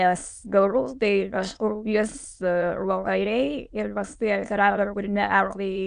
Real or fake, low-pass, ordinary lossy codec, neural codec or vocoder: fake; 9.9 kHz; Opus, 64 kbps; autoencoder, 22.05 kHz, a latent of 192 numbers a frame, VITS, trained on many speakers